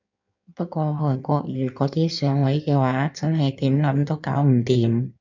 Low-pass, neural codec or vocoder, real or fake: 7.2 kHz; codec, 16 kHz in and 24 kHz out, 1.1 kbps, FireRedTTS-2 codec; fake